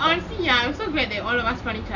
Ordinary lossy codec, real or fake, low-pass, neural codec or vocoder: none; real; 7.2 kHz; none